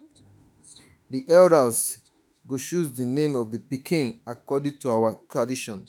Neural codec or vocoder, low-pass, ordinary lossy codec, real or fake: autoencoder, 48 kHz, 32 numbers a frame, DAC-VAE, trained on Japanese speech; none; none; fake